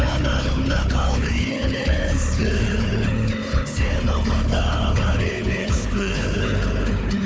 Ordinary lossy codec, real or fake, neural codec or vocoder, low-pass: none; fake; codec, 16 kHz, 4 kbps, FunCodec, trained on Chinese and English, 50 frames a second; none